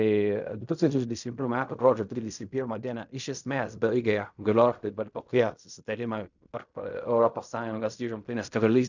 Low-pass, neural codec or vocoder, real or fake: 7.2 kHz; codec, 16 kHz in and 24 kHz out, 0.4 kbps, LongCat-Audio-Codec, fine tuned four codebook decoder; fake